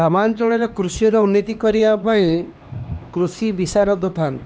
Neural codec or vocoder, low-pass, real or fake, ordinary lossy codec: codec, 16 kHz, 2 kbps, X-Codec, HuBERT features, trained on LibriSpeech; none; fake; none